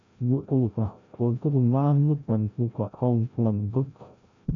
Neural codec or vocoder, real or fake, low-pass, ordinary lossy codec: codec, 16 kHz, 0.5 kbps, FreqCodec, larger model; fake; 7.2 kHz; MP3, 64 kbps